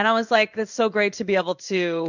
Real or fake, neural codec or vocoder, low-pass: fake; codec, 16 kHz in and 24 kHz out, 1 kbps, XY-Tokenizer; 7.2 kHz